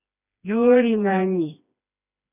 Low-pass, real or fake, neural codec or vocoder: 3.6 kHz; fake; codec, 16 kHz, 2 kbps, FreqCodec, smaller model